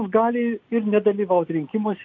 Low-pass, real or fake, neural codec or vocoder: 7.2 kHz; real; none